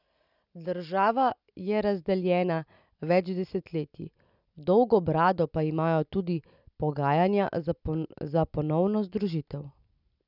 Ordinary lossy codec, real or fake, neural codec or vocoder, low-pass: none; real; none; 5.4 kHz